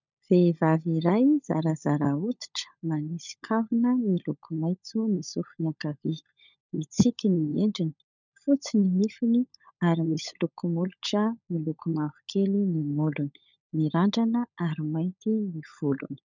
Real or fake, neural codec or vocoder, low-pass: fake; codec, 16 kHz, 16 kbps, FunCodec, trained on LibriTTS, 50 frames a second; 7.2 kHz